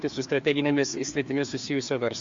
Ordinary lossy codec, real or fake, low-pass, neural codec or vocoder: MP3, 48 kbps; fake; 7.2 kHz; codec, 16 kHz, 2 kbps, FreqCodec, larger model